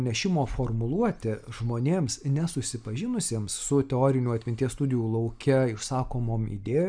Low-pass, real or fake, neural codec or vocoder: 9.9 kHz; real; none